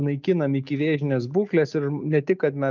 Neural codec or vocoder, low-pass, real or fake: none; 7.2 kHz; real